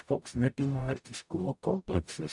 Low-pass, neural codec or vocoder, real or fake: 10.8 kHz; codec, 44.1 kHz, 0.9 kbps, DAC; fake